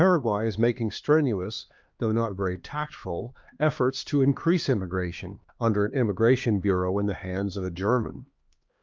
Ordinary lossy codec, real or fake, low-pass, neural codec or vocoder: Opus, 24 kbps; fake; 7.2 kHz; codec, 16 kHz, 2 kbps, X-Codec, HuBERT features, trained on LibriSpeech